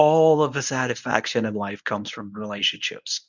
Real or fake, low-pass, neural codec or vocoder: fake; 7.2 kHz; codec, 24 kHz, 0.9 kbps, WavTokenizer, medium speech release version 1